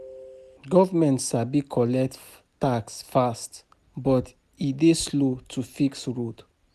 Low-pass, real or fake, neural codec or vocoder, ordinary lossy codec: 14.4 kHz; real; none; none